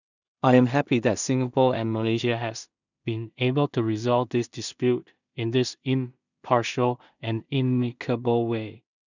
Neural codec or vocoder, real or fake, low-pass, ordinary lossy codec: codec, 16 kHz in and 24 kHz out, 0.4 kbps, LongCat-Audio-Codec, two codebook decoder; fake; 7.2 kHz; none